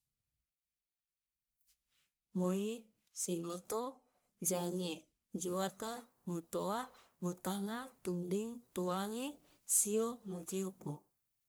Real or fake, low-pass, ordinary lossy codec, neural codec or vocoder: fake; none; none; codec, 44.1 kHz, 1.7 kbps, Pupu-Codec